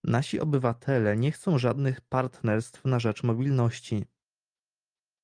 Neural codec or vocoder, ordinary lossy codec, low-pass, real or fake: none; Opus, 32 kbps; 9.9 kHz; real